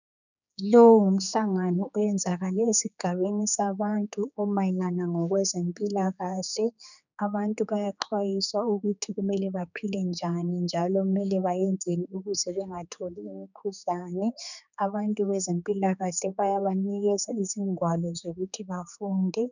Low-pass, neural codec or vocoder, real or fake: 7.2 kHz; codec, 16 kHz, 4 kbps, X-Codec, HuBERT features, trained on general audio; fake